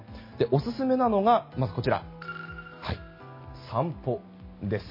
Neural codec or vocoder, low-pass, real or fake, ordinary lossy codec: none; 5.4 kHz; real; MP3, 24 kbps